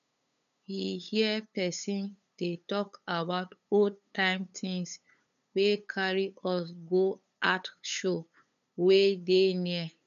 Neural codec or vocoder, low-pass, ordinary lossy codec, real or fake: codec, 16 kHz, 8 kbps, FunCodec, trained on LibriTTS, 25 frames a second; 7.2 kHz; none; fake